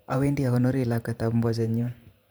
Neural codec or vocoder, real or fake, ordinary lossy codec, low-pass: vocoder, 44.1 kHz, 128 mel bands every 512 samples, BigVGAN v2; fake; none; none